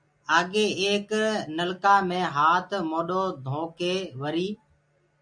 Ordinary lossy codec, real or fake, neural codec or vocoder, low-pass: MP3, 64 kbps; real; none; 9.9 kHz